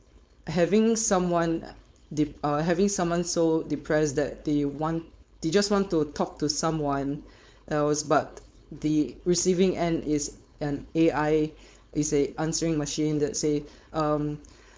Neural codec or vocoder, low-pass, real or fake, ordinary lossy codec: codec, 16 kHz, 4.8 kbps, FACodec; none; fake; none